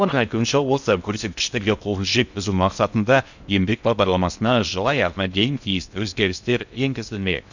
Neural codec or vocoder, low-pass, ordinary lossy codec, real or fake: codec, 16 kHz in and 24 kHz out, 0.6 kbps, FocalCodec, streaming, 2048 codes; 7.2 kHz; none; fake